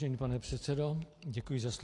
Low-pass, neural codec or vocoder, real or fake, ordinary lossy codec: 10.8 kHz; none; real; AAC, 48 kbps